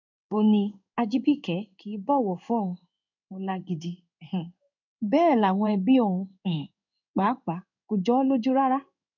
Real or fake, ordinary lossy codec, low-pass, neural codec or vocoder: fake; none; 7.2 kHz; codec, 16 kHz in and 24 kHz out, 1 kbps, XY-Tokenizer